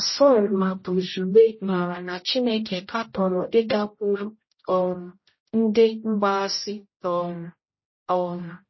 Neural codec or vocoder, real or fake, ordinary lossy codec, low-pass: codec, 16 kHz, 0.5 kbps, X-Codec, HuBERT features, trained on general audio; fake; MP3, 24 kbps; 7.2 kHz